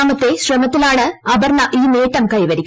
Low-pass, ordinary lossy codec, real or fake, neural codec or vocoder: none; none; real; none